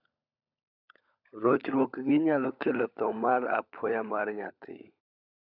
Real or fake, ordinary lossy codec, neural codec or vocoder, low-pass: fake; none; codec, 16 kHz, 16 kbps, FunCodec, trained on LibriTTS, 50 frames a second; 5.4 kHz